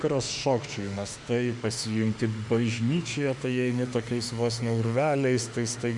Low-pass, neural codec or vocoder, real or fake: 10.8 kHz; autoencoder, 48 kHz, 32 numbers a frame, DAC-VAE, trained on Japanese speech; fake